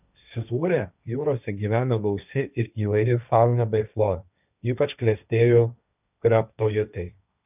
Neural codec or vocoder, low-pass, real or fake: codec, 16 kHz, 1.1 kbps, Voila-Tokenizer; 3.6 kHz; fake